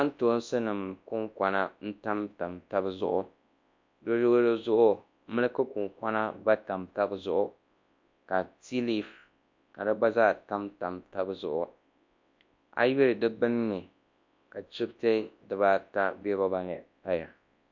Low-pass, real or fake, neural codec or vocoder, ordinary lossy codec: 7.2 kHz; fake; codec, 24 kHz, 0.9 kbps, WavTokenizer, large speech release; MP3, 48 kbps